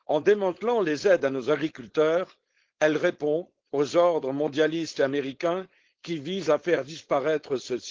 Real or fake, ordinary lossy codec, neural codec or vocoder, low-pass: fake; Opus, 16 kbps; codec, 16 kHz, 4.8 kbps, FACodec; 7.2 kHz